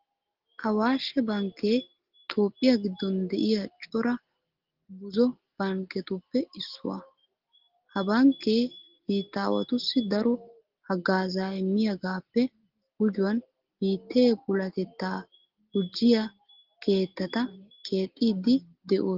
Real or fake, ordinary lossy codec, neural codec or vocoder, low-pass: real; Opus, 16 kbps; none; 5.4 kHz